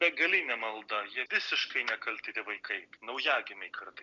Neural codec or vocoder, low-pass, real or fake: none; 7.2 kHz; real